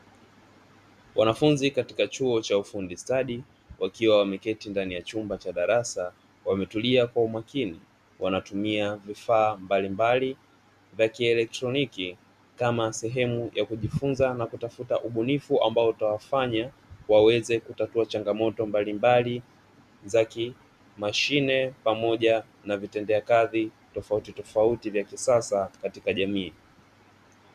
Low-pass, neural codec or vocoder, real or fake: 14.4 kHz; none; real